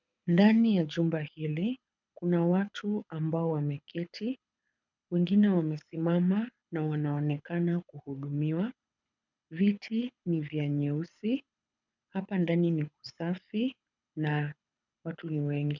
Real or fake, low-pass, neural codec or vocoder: fake; 7.2 kHz; codec, 24 kHz, 6 kbps, HILCodec